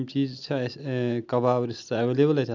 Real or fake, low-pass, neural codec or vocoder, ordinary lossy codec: real; 7.2 kHz; none; none